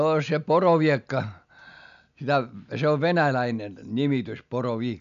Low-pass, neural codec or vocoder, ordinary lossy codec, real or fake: 7.2 kHz; none; MP3, 96 kbps; real